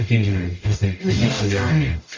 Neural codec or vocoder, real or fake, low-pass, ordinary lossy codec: codec, 44.1 kHz, 0.9 kbps, DAC; fake; 7.2 kHz; MP3, 48 kbps